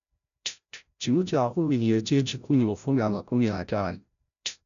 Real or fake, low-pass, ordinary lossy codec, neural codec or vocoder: fake; 7.2 kHz; none; codec, 16 kHz, 0.5 kbps, FreqCodec, larger model